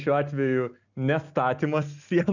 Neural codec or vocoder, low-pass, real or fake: none; 7.2 kHz; real